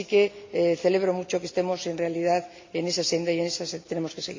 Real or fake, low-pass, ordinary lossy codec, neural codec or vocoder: real; 7.2 kHz; MP3, 48 kbps; none